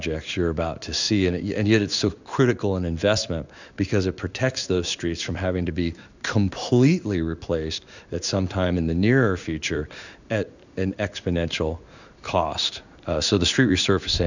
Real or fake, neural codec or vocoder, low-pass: fake; codec, 16 kHz in and 24 kHz out, 1 kbps, XY-Tokenizer; 7.2 kHz